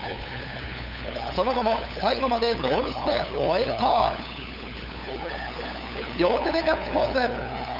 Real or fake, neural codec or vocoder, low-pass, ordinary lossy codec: fake; codec, 16 kHz, 8 kbps, FunCodec, trained on LibriTTS, 25 frames a second; 5.4 kHz; none